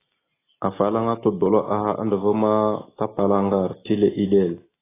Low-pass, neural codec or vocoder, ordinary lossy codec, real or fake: 3.6 kHz; none; AAC, 16 kbps; real